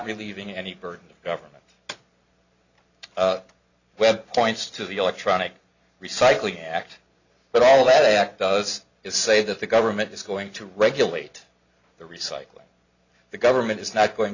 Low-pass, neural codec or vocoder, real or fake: 7.2 kHz; none; real